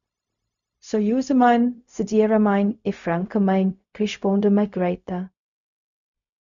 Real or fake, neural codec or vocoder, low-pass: fake; codec, 16 kHz, 0.4 kbps, LongCat-Audio-Codec; 7.2 kHz